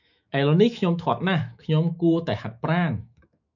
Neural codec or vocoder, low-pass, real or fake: autoencoder, 48 kHz, 128 numbers a frame, DAC-VAE, trained on Japanese speech; 7.2 kHz; fake